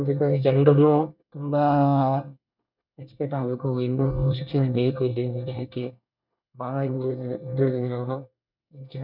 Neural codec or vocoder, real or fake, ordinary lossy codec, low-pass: codec, 24 kHz, 1 kbps, SNAC; fake; none; 5.4 kHz